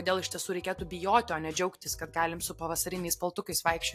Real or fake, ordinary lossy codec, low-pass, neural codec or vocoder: real; AAC, 64 kbps; 14.4 kHz; none